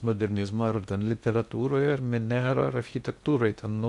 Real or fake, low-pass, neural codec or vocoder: fake; 10.8 kHz; codec, 16 kHz in and 24 kHz out, 0.6 kbps, FocalCodec, streaming, 2048 codes